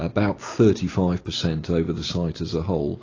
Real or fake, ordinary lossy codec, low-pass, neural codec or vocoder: real; AAC, 32 kbps; 7.2 kHz; none